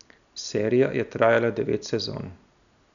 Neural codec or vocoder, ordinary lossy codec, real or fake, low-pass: none; none; real; 7.2 kHz